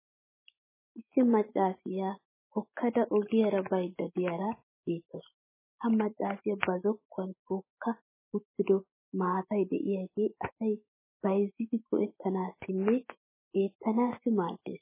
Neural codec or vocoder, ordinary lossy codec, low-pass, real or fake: autoencoder, 48 kHz, 128 numbers a frame, DAC-VAE, trained on Japanese speech; MP3, 16 kbps; 3.6 kHz; fake